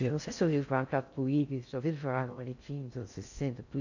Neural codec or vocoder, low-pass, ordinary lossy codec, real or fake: codec, 16 kHz in and 24 kHz out, 0.6 kbps, FocalCodec, streaming, 4096 codes; 7.2 kHz; none; fake